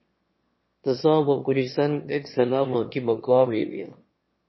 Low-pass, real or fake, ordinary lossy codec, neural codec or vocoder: 7.2 kHz; fake; MP3, 24 kbps; autoencoder, 22.05 kHz, a latent of 192 numbers a frame, VITS, trained on one speaker